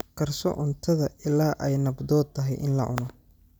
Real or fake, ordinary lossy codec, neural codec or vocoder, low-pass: real; none; none; none